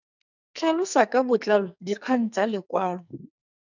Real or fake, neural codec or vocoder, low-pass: fake; codec, 44.1 kHz, 2.6 kbps, SNAC; 7.2 kHz